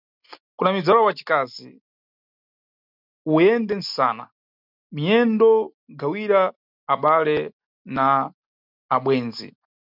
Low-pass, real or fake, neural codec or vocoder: 5.4 kHz; real; none